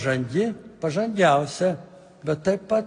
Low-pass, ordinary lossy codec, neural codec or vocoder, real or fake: 9.9 kHz; AAC, 48 kbps; none; real